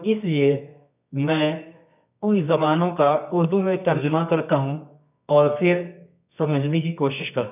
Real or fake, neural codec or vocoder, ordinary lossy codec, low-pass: fake; codec, 24 kHz, 0.9 kbps, WavTokenizer, medium music audio release; none; 3.6 kHz